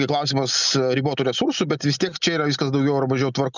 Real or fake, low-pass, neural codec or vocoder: real; 7.2 kHz; none